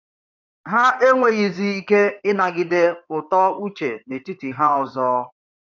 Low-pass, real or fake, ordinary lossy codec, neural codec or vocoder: 7.2 kHz; fake; none; codec, 16 kHz in and 24 kHz out, 2.2 kbps, FireRedTTS-2 codec